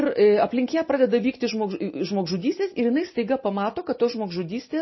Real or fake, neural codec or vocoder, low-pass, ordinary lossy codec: real; none; 7.2 kHz; MP3, 24 kbps